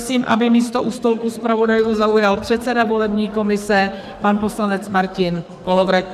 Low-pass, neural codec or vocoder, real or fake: 14.4 kHz; codec, 44.1 kHz, 2.6 kbps, SNAC; fake